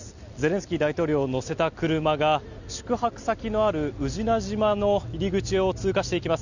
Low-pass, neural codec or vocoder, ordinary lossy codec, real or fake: 7.2 kHz; none; none; real